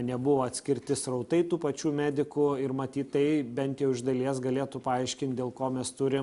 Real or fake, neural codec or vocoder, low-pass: real; none; 10.8 kHz